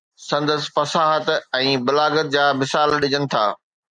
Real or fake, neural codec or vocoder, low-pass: real; none; 9.9 kHz